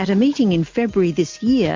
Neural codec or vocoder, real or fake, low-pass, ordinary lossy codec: none; real; 7.2 kHz; MP3, 48 kbps